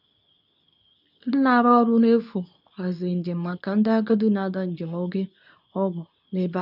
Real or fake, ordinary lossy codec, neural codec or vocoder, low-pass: fake; none; codec, 24 kHz, 0.9 kbps, WavTokenizer, medium speech release version 2; 5.4 kHz